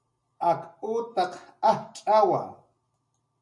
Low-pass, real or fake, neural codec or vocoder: 10.8 kHz; real; none